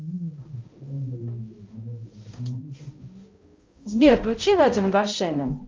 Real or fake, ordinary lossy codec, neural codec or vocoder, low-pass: fake; Opus, 32 kbps; codec, 16 kHz, 0.5 kbps, X-Codec, HuBERT features, trained on balanced general audio; 7.2 kHz